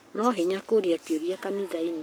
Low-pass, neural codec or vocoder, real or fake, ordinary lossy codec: none; codec, 44.1 kHz, 7.8 kbps, Pupu-Codec; fake; none